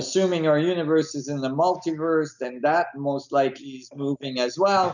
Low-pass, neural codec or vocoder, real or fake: 7.2 kHz; none; real